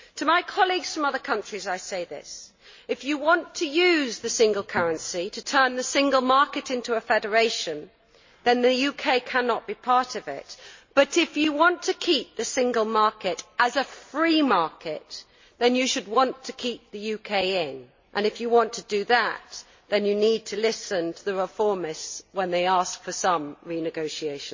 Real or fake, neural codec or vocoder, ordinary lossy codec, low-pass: real; none; MP3, 32 kbps; 7.2 kHz